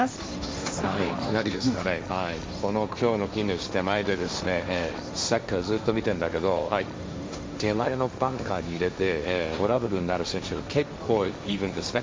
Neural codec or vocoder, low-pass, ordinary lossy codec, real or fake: codec, 16 kHz, 1.1 kbps, Voila-Tokenizer; none; none; fake